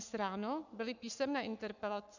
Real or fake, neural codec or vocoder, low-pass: fake; codec, 44.1 kHz, 7.8 kbps, Pupu-Codec; 7.2 kHz